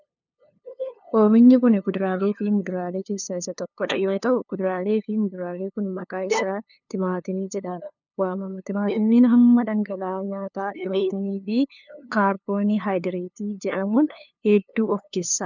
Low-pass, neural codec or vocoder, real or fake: 7.2 kHz; codec, 16 kHz, 2 kbps, FunCodec, trained on LibriTTS, 25 frames a second; fake